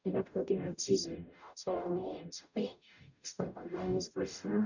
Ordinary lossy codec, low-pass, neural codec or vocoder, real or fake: none; 7.2 kHz; codec, 44.1 kHz, 0.9 kbps, DAC; fake